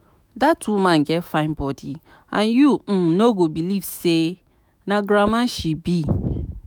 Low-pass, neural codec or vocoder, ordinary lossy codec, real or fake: none; autoencoder, 48 kHz, 128 numbers a frame, DAC-VAE, trained on Japanese speech; none; fake